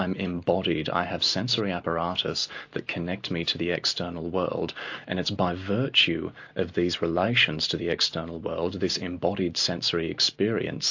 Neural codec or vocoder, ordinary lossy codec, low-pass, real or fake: none; AAC, 48 kbps; 7.2 kHz; real